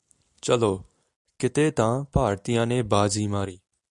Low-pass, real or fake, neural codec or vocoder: 10.8 kHz; real; none